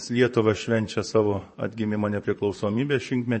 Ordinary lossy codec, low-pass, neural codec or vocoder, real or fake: MP3, 32 kbps; 10.8 kHz; vocoder, 44.1 kHz, 128 mel bands, Pupu-Vocoder; fake